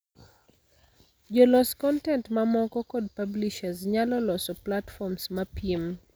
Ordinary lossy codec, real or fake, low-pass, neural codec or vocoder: none; real; none; none